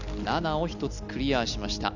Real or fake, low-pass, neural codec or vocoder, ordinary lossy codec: real; 7.2 kHz; none; none